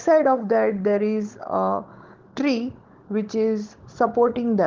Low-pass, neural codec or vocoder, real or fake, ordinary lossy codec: 7.2 kHz; codec, 16 kHz, 16 kbps, FunCodec, trained on Chinese and English, 50 frames a second; fake; Opus, 16 kbps